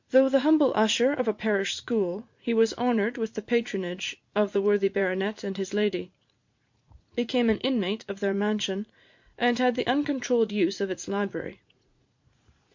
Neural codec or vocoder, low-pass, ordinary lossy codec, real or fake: vocoder, 44.1 kHz, 80 mel bands, Vocos; 7.2 kHz; MP3, 48 kbps; fake